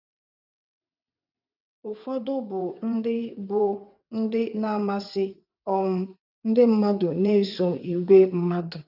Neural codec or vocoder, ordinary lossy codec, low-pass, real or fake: vocoder, 22.05 kHz, 80 mel bands, WaveNeXt; none; 5.4 kHz; fake